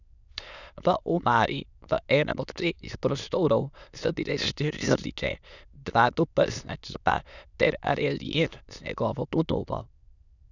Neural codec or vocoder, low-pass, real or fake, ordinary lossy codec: autoencoder, 22.05 kHz, a latent of 192 numbers a frame, VITS, trained on many speakers; 7.2 kHz; fake; none